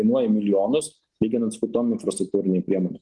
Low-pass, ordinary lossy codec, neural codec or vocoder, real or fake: 10.8 kHz; Opus, 24 kbps; none; real